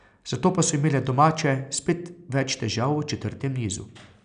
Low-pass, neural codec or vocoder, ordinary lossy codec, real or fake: 9.9 kHz; none; none; real